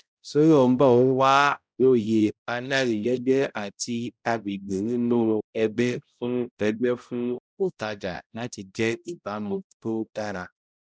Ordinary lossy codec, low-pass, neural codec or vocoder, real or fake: none; none; codec, 16 kHz, 0.5 kbps, X-Codec, HuBERT features, trained on balanced general audio; fake